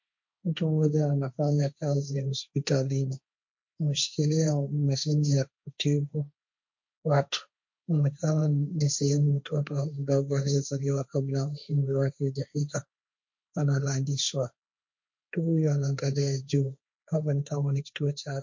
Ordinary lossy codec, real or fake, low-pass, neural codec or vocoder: MP3, 48 kbps; fake; 7.2 kHz; codec, 16 kHz, 1.1 kbps, Voila-Tokenizer